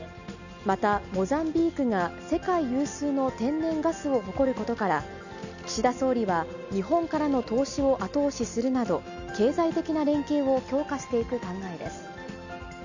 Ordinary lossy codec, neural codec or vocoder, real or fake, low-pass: none; none; real; 7.2 kHz